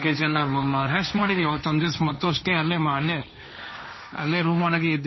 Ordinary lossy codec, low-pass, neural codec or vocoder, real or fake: MP3, 24 kbps; 7.2 kHz; codec, 16 kHz, 1.1 kbps, Voila-Tokenizer; fake